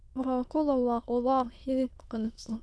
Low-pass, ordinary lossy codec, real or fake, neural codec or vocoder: none; none; fake; autoencoder, 22.05 kHz, a latent of 192 numbers a frame, VITS, trained on many speakers